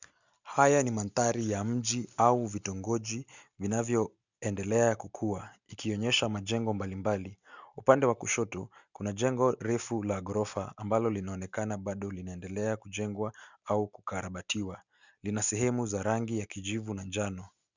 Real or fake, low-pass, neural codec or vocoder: real; 7.2 kHz; none